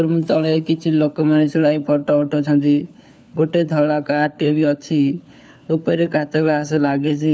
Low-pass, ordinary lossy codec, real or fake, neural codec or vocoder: none; none; fake; codec, 16 kHz, 4 kbps, FunCodec, trained on LibriTTS, 50 frames a second